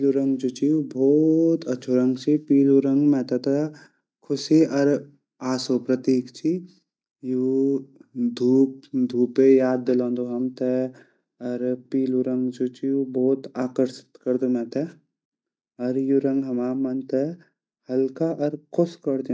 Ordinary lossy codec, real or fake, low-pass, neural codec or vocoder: none; real; none; none